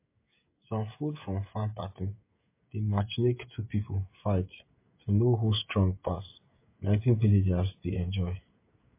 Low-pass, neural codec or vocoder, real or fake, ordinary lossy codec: 3.6 kHz; codec, 16 kHz, 16 kbps, FreqCodec, smaller model; fake; MP3, 24 kbps